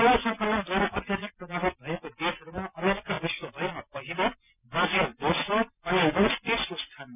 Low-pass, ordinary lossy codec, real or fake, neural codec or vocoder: 3.6 kHz; none; real; none